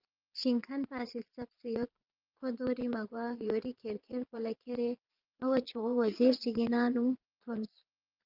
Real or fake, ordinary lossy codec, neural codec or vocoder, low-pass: fake; Opus, 24 kbps; vocoder, 22.05 kHz, 80 mel bands, Vocos; 5.4 kHz